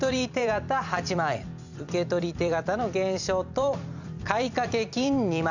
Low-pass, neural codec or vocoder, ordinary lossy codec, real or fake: 7.2 kHz; vocoder, 44.1 kHz, 128 mel bands every 256 samples, BigVGAN v2; none; fake